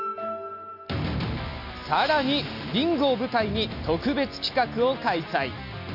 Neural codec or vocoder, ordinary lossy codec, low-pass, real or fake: none; AAC, 48 kbps; 5.4 kHz; real